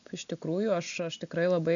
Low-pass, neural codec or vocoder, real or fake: 7.2 kHz; none; real